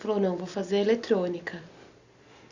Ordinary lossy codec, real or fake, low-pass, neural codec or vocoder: Opus, 64 kbps; real; 7.2 kHz; none